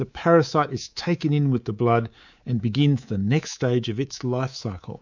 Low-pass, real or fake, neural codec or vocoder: 7.2 kHz; fake; codec, 24 kHz, 3.1 kbps, DualCodec